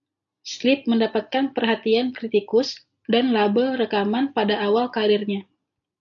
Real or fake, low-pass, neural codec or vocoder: real; 7.2 kHz; none